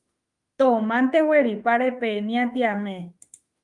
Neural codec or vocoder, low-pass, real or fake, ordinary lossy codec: autoencoder, 48 kHz, 32 numbers a frame, DAC-VAE, trained on Japanese speech; 10.8 kHz; fake; Opus, 32 kbps